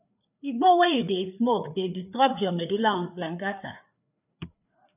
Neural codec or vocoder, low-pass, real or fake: codec, 16 kHz, 4 kbps, FreqCodec, larger model; 3.6 kHz; fake